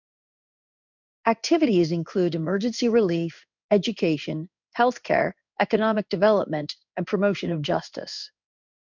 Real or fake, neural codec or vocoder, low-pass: fake; codec, 16 kHz in and 24 kHz out, 1 kbps, XY-Tokenizer; 7.2 kHz